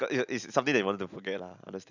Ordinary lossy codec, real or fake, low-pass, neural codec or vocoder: none; real; 7.2 kHz; none